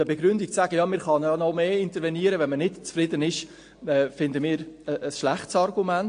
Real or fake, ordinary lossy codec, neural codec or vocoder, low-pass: real; AAC, 48 kbps; none; 9.9 kHz